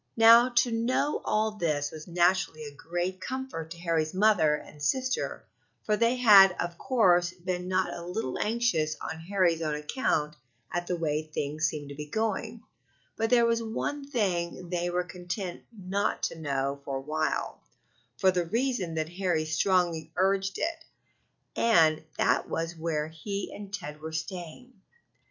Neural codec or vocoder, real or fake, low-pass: none; real; 7.2 kHz